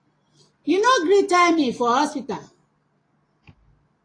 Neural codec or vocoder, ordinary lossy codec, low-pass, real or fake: none; AAC, 32 kbps; 9.9 kHz; real